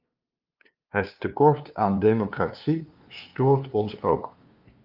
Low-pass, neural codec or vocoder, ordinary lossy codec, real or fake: 5.4 kHz; codec, 16 kHz, 2 kbps, FunCodec, trained on LibriTTS, 25 frames a second; Opus, 32 kbps; fake